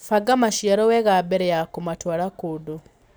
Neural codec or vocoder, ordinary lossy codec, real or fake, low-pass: none; none; real; none